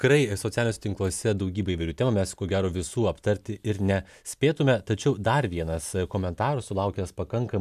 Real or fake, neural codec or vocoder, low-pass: real; none; 14.4 kHz